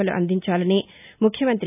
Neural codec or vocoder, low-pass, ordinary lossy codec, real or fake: none; 3.6 kHz; none; real